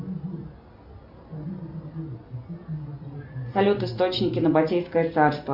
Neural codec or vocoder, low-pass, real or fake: none; 5.4 kHz; real